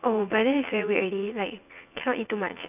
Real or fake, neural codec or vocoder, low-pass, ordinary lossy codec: fake; vocoder, 44.1 kHz, 80 mel bands, Vocos; 3.6 kHz; none